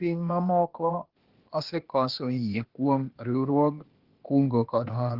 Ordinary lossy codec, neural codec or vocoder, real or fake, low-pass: Opus, 16 kbps; codec, 16 kHz, 0.8 kbps, ZipCodec; fake; 5.4 kHz